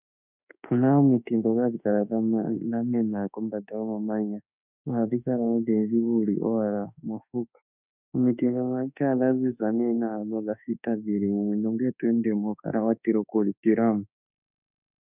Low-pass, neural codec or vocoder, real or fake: 3.6 kHz; autoencoder, 48 kHz, 32 numbers a frame, DAC-VAE, trained on Japanese speech; fake